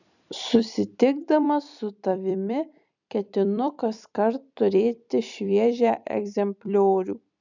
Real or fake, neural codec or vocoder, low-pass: fake; vocoder, 44.1 kHz, 128 mel bands every 256 samples, BigVGAN v2; 7.2 kHz